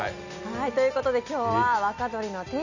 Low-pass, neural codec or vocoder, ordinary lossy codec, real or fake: 7.2 kHz; none; none; real